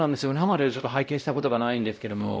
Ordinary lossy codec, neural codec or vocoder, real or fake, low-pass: none; codec, 16 kHz, 0.5 kbps, X-Codec, WavLM features, trained on Multilingual LibriSpeech; fake; none